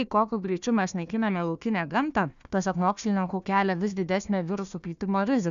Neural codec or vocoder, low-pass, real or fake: codec, 16 kHz, 1 kbps, FunCodec, trained on Chinese and English, 50 frames a second; 7.2 kHz; fake